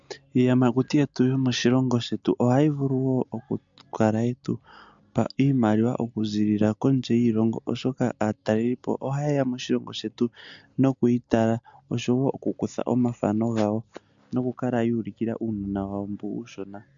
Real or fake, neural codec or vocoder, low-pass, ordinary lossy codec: real; none; 7.2 kHz; MP3, 64 kbps